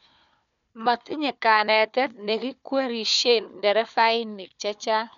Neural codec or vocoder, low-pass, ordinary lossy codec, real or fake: codec, 16 kHz, 4 kbps, FunCodec, trained on Chinese and English, 50 frames a second; 7.2 kHz; none; fake